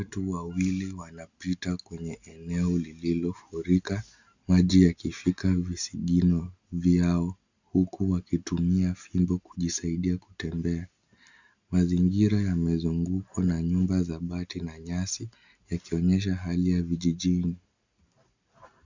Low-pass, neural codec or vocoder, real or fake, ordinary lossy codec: 7.2 kHz; none; real; Opus, 64 kbps